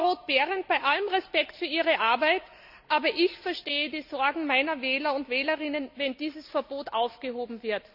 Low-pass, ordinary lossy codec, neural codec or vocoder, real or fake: 5.4 kHz; none; none; real